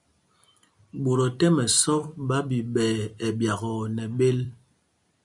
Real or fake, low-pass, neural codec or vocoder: fake; 10.8 kHz; vocoder, 44.1 kHz, 128 mel bands every 256 samples, BigVGAN v2